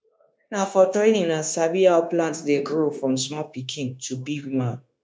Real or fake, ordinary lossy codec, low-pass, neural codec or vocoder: fake; none; none; codec, 16 kHz, 0.9 kbps, LongCat-Audio-Codec